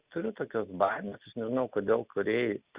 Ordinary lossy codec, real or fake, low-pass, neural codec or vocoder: Opus, 64 kbps; real; 3.6 kHz; none